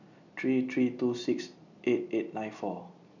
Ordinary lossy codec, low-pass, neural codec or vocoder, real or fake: none; 7.2 kHz; none; real